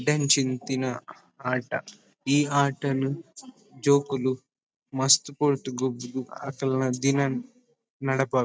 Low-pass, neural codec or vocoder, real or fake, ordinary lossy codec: none; none; real; none